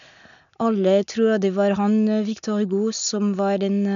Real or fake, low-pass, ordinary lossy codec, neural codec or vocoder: real; 7.2 kHz; none; none